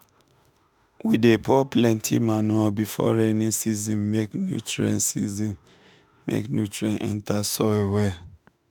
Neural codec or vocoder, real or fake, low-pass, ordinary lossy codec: autoencoder, 48 kHz, 32 numbers a frame, DAC-VAE, trained on Japanese speech; fake; none; none